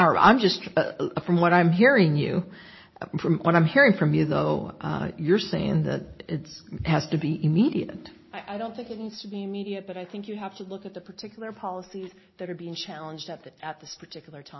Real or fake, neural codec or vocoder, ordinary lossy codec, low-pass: real; none; MP3, 24 kbps; 7.2 kHz